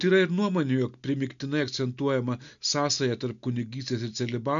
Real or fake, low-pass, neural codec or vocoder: real; 7.2 kHz; none